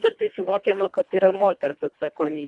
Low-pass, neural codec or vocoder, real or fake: 10.8 kHz; codec, 24 kHz, 1.5 kbps, HILCodec; fake